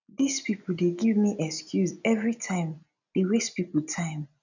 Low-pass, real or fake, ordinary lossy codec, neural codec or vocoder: 7.2 kHz; real; none; none